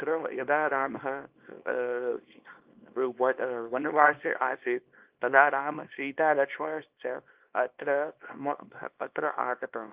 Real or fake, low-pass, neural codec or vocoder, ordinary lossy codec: fake; 3.6 kHz; codec, 24 kHz, 0.9 kbps, WavTokenizer, small release; Opus, 24 kbps